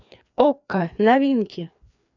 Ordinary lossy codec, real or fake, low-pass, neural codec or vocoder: none; fake; 7.2 kHz; codec, 16 kHz, 2 kbps, FreqCodec, larger model